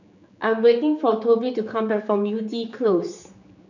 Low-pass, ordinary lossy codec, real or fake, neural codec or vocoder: 7.2 kHz; none; fake; codec, 16 kHz, 4 kbps, X-Codec, HuBERT features, trained on general audio